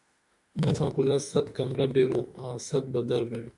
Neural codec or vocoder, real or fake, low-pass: autoencoder, 48 kHz, 32 numbers a frame, DAC-VAE, trained on Japanese speech; fake; 10.8 kHz